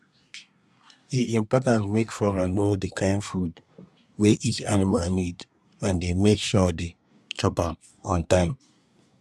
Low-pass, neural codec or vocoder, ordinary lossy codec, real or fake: none; codec, 24 kHz, 1 kbps, SNAC; none; fake